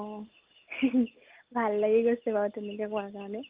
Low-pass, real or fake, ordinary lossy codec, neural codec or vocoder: 3.6 kHz; real; Opus, 32 kbps; none